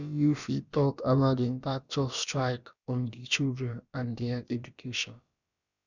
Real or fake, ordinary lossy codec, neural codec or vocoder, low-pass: fake; none; codec, 16 kHz, about 1 kbps, DyCAST, with the encoder's durations; 7.2 kHz